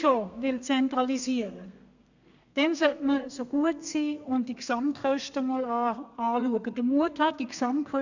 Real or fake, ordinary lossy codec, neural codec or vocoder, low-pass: fake; none; codec, 32 kHz, 1.9 kbps, SNAC; 7.2 kHz